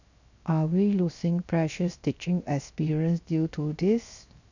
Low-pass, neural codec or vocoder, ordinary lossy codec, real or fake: 7.2 kHz; codec, 16 kHz, 0.7 kbps, FocalCodec; none; fake